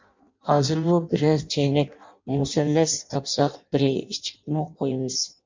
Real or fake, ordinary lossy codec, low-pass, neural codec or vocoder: fake; MP3, 64 kbps; 7.2 kHz; codec, 16 kHz in and 24 kHz out, 0.6 kbps, FireRedTTS-2 codec